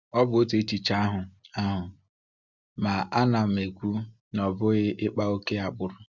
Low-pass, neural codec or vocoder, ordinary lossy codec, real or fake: 7.2 kHz; none; Opus, 64 kbps; real